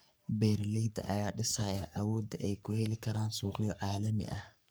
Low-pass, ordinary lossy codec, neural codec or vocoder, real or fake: none; none; codec, 44.1 kHz, 3.4 kbps, Pupu-Codec; fake